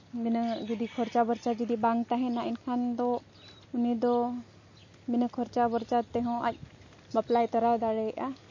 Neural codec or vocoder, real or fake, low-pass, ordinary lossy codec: none; real; 7.2 kHz; MP3, 32 kbps